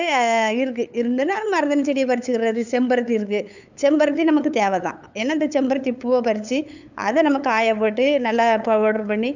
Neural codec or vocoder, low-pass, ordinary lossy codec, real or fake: codec, 16 kHz, 8 kbps, FunCodec, trained on LibriTTS, 25 frames a second; 7.2 kHz; none; fake